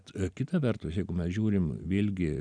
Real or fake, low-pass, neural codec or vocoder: real; 9.9 kHz; none